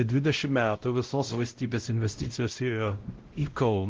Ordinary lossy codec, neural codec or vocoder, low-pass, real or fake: Opus, 16 kbps; codec, 16 kHz, 0.5 kbps, X-Codec, WavLM features, trained on Multilingual LibriSpeech; 7.2 kHz; fake